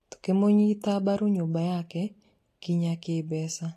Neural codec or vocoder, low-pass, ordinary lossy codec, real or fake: none; 14.4 kHz; AAC, 48 kbps; real